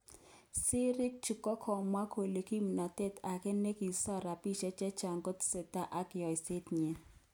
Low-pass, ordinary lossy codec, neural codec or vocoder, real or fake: none; none; none; real